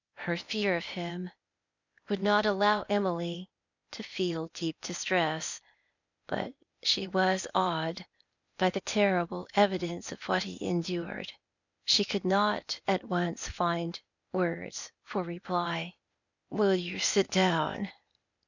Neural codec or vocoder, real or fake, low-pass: codec, 16 kHz, 0.8 kbps, ZipCodec; fake; 7.2 kHz